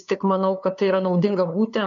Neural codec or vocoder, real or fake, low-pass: codec, 16 kHz, 2 kbps, FunCodec, trained on LibriTTS, 25 frames a second; fake; 7.2 kHz